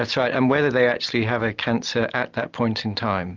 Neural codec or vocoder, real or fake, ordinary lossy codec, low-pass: none; real; Opus, 24 kbps; 7.2 kHz